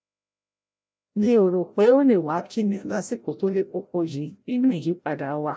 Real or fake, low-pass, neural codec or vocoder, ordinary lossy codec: fake; none; codec, 16 kHz, 0.5 kbps, FreqCodec, larger model; none